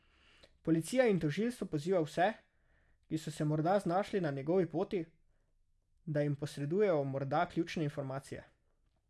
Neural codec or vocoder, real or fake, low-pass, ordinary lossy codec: none; real; none; none